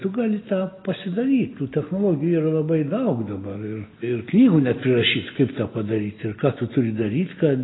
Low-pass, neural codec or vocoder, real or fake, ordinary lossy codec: 7.2 kHz; none; real; AAC, 16 kbps